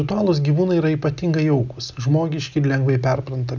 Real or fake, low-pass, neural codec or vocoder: real; 7.2 kHz; none